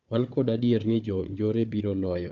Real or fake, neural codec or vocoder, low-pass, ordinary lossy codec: fake; codec, 16 kHz, 4 kbps, FunCodec, trained on Chinese and English, 50 frames a second; 7.2 kHz; Opus, 32 kbps